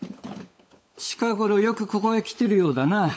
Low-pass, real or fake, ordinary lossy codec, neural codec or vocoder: none; fake; none; codec, 16 kHz, 16 kbps, FunCodec, trained on LibriTTS, 50 frames a second